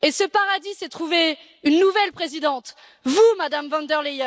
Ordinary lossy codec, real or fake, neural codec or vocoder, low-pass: none; real; none; none